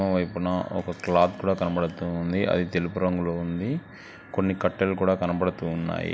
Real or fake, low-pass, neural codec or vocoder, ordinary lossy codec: real; none; none; none